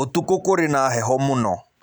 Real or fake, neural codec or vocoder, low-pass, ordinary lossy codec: real; none; none; none